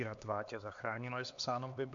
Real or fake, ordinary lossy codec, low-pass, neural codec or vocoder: fake; MP3, 48 kbps; 7.2 kHz; codec, 16 kHz, 2 kbps, X-Codec, HuBERT features, trained on LibriSpeech